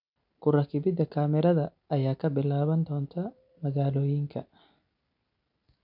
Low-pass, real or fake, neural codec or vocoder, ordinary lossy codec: 5.4 kHz; real; none; none